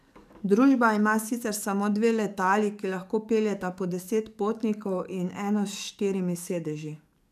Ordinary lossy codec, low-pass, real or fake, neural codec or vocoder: none; 14.4 kHz; fake; codec, 44.1 kHz, 7.8 kbps, DAC